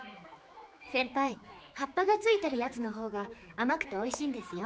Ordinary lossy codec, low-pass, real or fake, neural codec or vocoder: none; none; fake; codec, 16 kHz, 4 kbps, X-Codec, HuBERT features, trained on general audio